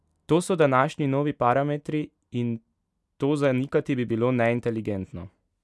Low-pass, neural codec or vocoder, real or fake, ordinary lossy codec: none; none; real; none